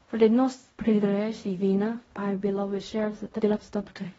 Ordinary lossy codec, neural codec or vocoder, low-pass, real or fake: AAC, 24 kbps; codec, 16 kHz in and 24 kHz out, 0.4 kbps, LongCat-Audio-Codec, fine tuned four codebook decoder; 10.8 kHz; fake